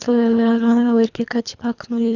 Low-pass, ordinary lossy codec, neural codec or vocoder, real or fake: 7.2 kHz; none; codec, 24 kHz, 3 kbps, HILCodec; fake